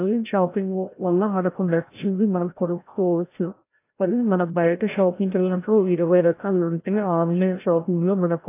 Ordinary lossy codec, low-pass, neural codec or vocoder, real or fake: AAC, 24 kbps; 3.6 kHz; codec, 16 kHz, 0.5 kbps, FreqCodec, larger model; fake